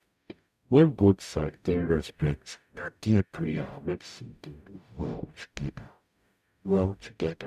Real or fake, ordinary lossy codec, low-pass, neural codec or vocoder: fake; none; 14.4 kHz; codec, 44.1 kHz, 0.9 kbps, DAC